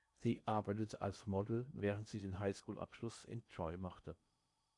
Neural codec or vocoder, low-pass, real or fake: codec, 16 kHz in and 24 kHz out, 0.8 kbps, FocalCodec, streaming, 65536 codes; 10.8 kHz; fake